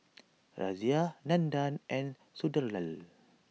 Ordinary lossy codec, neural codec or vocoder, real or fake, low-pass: none; none; real; none